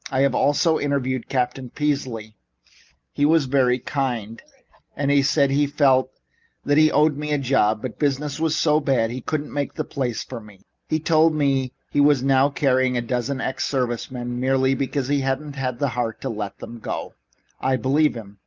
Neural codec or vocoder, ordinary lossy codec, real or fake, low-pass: none; Opus, 24 kbps; real; 7.2 kHz